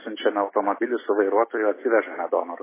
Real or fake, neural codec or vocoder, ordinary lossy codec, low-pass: real; none; MP3, 16 kbps; 3.6 kHz